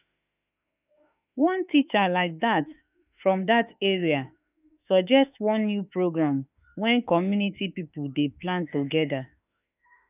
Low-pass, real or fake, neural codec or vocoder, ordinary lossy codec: 3.6 kHz; fake; autoencoder, 48 kHz, 32 numbers a frame, DAC-VAE, trained on Japanese speech; none